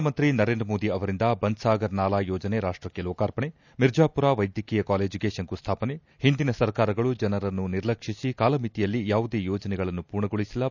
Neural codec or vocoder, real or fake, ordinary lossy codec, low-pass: none; real; none; 7.2 kHz